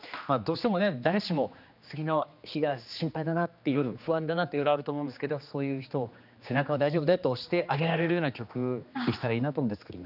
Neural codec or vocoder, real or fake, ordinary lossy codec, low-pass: codec, 16 kHz, 2 kbps, X-Codec, HuBERT features, trained on general audio; fake; none; 5.4 kHz